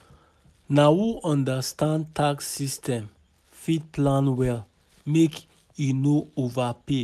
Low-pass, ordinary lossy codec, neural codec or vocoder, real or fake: 14.4 kHz; none; none; real